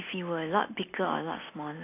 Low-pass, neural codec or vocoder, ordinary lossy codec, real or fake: 3.6 kHz; none; AAC, 24 kbps; real